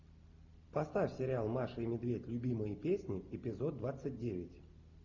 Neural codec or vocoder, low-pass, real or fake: none; 7.2 kHz; real